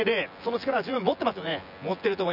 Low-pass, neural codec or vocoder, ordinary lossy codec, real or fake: 5.4 kHz; vocoder, 24 kHz, 100 mel bands, Vocos; none; fake